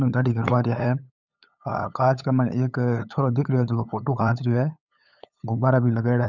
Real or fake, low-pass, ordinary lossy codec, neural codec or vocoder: fake; 7.2 kHz; none; codec, 16 kHz, 8 kbps, FunCodec, trained on LibriTTS, 25 frames a second